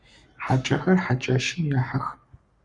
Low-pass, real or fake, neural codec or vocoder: 10.8 kHz; fake; codec, 44.1 kHz, 7.8 kbps, Pupu-Codec